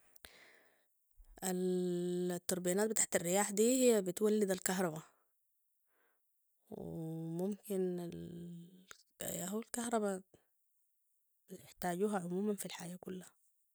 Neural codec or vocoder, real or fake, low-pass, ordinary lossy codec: none; real; none; none